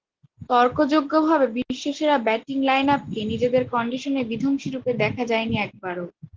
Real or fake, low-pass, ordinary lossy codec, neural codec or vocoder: real; 7.2 kHz; Opus, 16 kbps; none